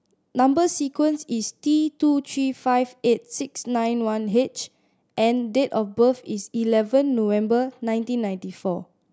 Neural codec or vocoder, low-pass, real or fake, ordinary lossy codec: none; none; real; none